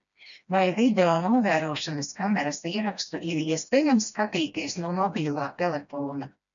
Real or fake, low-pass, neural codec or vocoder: fake; 7.2 kHz; codec, 16 kHz, 1 kbps, FreqCodec, smaller model